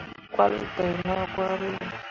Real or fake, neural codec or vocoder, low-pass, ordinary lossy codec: real; none; 7.2 kHz; MP3, 32 kbps